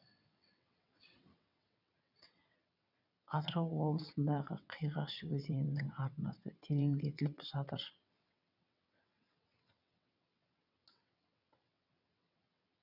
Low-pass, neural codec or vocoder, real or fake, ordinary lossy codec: 5.4 kHz; vocoder, 22.05 kHz, 80 mel bands, WaveNeXt; fake; none